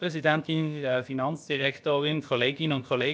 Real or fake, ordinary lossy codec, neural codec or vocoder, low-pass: fake; none; codec, 16 kHz, about 1 kbps, DyCAST, with the encoder's durations; none